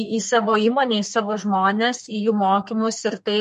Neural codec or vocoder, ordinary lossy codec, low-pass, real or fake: codec, 32 kHz, 1.9 kbps, SNAC; MP3, 48 kbps; 14.4 kHz; fake